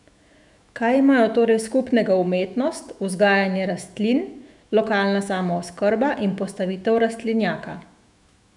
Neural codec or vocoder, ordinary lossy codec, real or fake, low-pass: autoencoder, 48 kHz, 128 numbers a frame, DAC-VAE, trained on Japanese speech; none; fake; 10.8 kHz